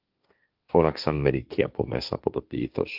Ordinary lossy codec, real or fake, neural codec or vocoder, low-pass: Opus, 24 kbps; fake; codec, 16 kHz, 1.1 kbps, Voila-Tokenizer; 5.4 kHz